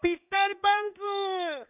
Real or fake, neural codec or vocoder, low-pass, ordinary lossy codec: fake; codec, 16 kHz in and 24 kHz out, 1 kbps, XY-Tokenizer; 3.6 kHz; none